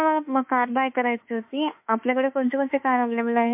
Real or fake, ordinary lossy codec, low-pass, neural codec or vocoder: fake; MP3, 32 kbps; 3.6 kHz; autoencoder, 48 kHz, 32 numbers a frame, DAC-VAE, trained on Japanese speech